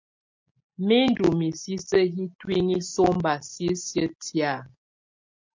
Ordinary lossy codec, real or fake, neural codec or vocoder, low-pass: MP3, 48 kbps; real; none; 7.2 kHz